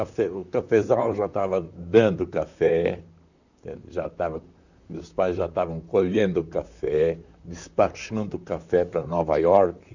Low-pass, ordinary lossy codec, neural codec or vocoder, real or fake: 7.2 kHz; none; vocoder, 44.1 kHz, 128 mel bands, Pupu-Vocoder; fake